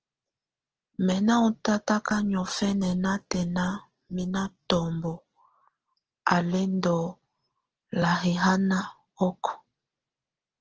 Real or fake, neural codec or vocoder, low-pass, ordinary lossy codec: real; none; 7.2 kHz; Opus, 16 kbps